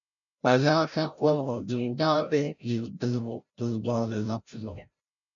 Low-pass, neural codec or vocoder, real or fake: 7.2 kHz; codec, 16 kHz, 0.5 kbps, FreqCodec, larger model; fake